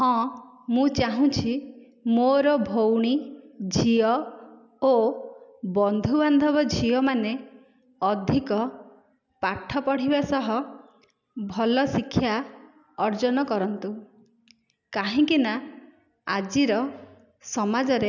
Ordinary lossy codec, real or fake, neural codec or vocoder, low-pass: none; real; none; 7.2 kHz